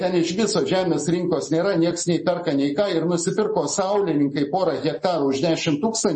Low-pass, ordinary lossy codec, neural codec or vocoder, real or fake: 10.8 kHz; MP3, 32 kbps; none; real